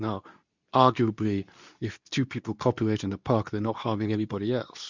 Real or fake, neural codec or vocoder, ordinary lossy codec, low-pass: fake; codec, 24 kHz, 0.9 kbps, WavTokenizer, medium speech release version 2; Opus, 64 kbps; 7.2 kHz